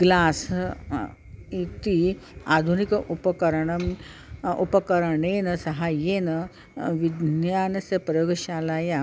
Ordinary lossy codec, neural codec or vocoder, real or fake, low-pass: none; none; real; none